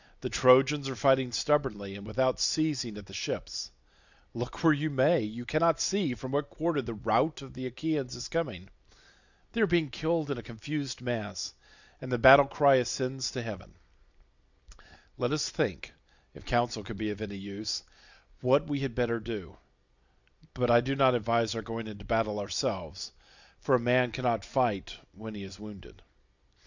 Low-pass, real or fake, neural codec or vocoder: 7.2 kHz; real; none